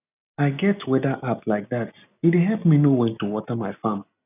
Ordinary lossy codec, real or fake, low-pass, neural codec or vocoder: none; real; 3.6 kHz; none